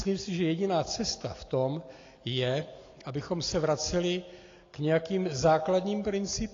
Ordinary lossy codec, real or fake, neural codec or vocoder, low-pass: AAC, 32 kbps; real; none; 7.2 kHz